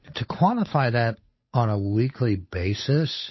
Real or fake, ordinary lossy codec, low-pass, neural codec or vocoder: fake; MP3, 24 kbps; 7.2 kHz; codec, 16 kHz, 8 kbps, FunCodec, trained on Chinese and English, 25 frames a second